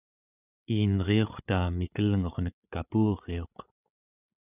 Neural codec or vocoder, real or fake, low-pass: codec, 16 kHz, 8 kbps, FreqCodec, larger model; fake; 3.6 kHz